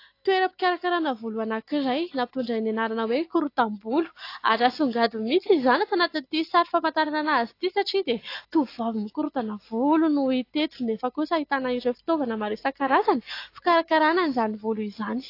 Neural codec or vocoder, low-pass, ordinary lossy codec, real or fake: none; 5.4 kHz; AAC, 32 kbps; real